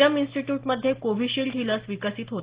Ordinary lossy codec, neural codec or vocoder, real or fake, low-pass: Opus, 16 kbps; none; real; 3.6 kHz